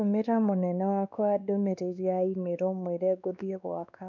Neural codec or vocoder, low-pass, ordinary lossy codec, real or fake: codec, 16 kHz, 2 kbps, X-Codec, WavLM features, trained on Multilingual LibriSpeech; 7.2 kHz; none; fake